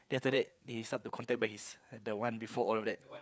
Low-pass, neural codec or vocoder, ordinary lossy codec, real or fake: none; codec, 16 kHz, 4 kbps, FreqCodec, larger model; none; fake